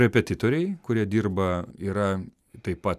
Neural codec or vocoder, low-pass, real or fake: none; 14.4 kHz; real